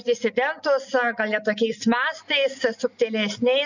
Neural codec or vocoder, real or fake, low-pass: none; real; 7.2 kHz